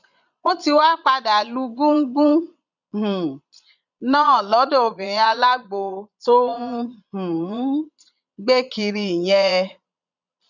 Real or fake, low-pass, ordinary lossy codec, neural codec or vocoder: fake; 7.2 kHz; none; vocoder, 22.05 kHz, 80 mel bands, Vocos